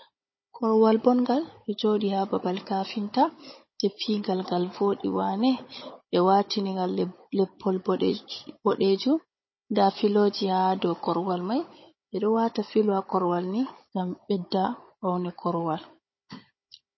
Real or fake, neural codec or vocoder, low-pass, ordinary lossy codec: fake; codec, 16 kHz, 16 kbps, FunCodec, trained on Chinese and English, 50 frames a second; 7.2 kHz; MP3, 24 kbps